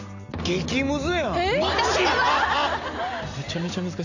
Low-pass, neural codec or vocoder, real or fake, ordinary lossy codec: 7.2 kHz; none; real; none